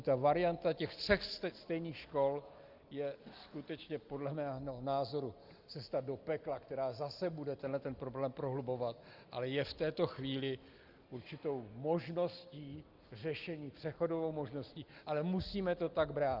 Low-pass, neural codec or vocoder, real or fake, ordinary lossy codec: 5.4 kHz; none; real; Opus, 32 kbps